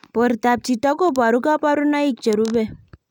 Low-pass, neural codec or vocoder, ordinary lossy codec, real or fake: 19.8 kHz; none; none; real